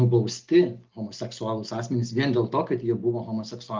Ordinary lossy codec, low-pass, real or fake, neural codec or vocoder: Opus, 24 kbps; 7.2 kHz; real; none